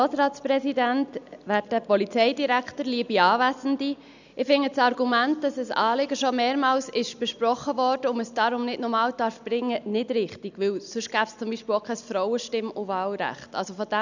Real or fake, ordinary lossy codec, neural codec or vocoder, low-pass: real; none; none; 7.2 kHz